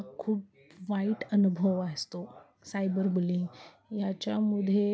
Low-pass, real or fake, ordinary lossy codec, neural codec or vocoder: none; real; none; none